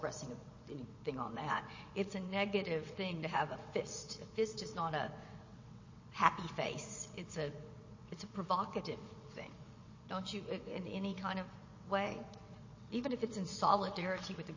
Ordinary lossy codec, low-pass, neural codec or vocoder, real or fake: MP3, 32 kbps; 7.2 kHz; vocoder, 22.05 kHz, 80 mel bands, WaveNeXt; fake